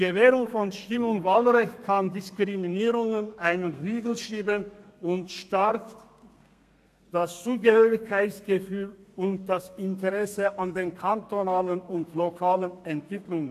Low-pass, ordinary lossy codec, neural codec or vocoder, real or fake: 14.4 kHz; AAC, 96 kbps; codec, 32 kHz, 1.9 kbps, SNAC; fake